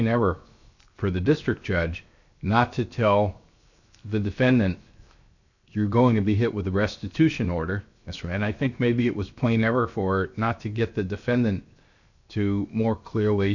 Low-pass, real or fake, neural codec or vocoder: 7.2 kHz; fake; codec, 16 kHz, 0.7 kbps, FocalCodec